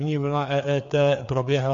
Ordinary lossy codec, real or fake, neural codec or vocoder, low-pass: MP3, 48 kbps; fake; codec, 16 kHz, 4 kbps, FreqCodec, larger model; 7.2 kHz